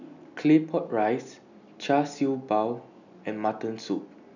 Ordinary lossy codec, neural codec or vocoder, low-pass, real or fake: none; none; 7.2 kHz; real